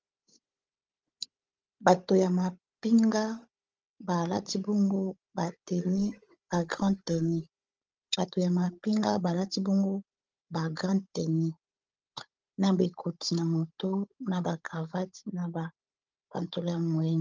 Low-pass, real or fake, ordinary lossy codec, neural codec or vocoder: 7.2 kHz; fake; Opus, 24 kbps; codec, 16 kHz, 16 kbps, FunCodec, trained on Chinese and English, 50 frames a second